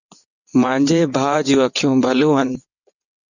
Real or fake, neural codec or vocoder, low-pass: fake; vocoder, 22.05 kHz, 80 mel bands, WaveNeXt; 7.2 kHz